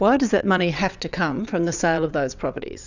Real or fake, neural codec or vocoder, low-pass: fake; vocoder, 22.05 kHz, 80 mel bands, WaveNeXt; 7.2 kHz